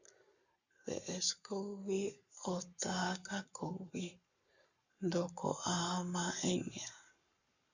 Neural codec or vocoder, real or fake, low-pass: codec, 44.1 kHz, 7.8 kbps, Pupu-Codec; fake; 7.2 kHz